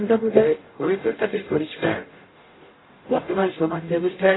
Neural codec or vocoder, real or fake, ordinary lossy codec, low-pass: codec, 44.1 kHz, 0.9 kbps, DAC; fake; AAC, 16 kbps; 7.2 kHz